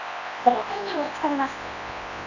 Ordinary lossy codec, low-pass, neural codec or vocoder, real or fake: none; 7.2 kHz; codec, 24 kHz, 0.9 kbps, WavTokenizer, large speech release; fake